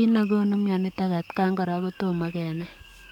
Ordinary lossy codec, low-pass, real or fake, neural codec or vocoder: none; 19.8 kHz; fake; codec, 44.1 kHz, 7.8 kbps, DAC